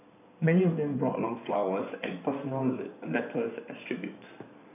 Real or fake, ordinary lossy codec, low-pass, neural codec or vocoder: fake; none; 3.6 kHz; codec, 16 kHz in and 24 kHz out, 2.2 kbps, FireRedTTS-2 codec